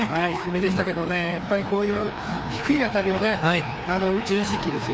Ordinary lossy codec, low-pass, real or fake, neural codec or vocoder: none; none; fake; codec, 16 kHz, 2 kbps, FreqCodec, larger model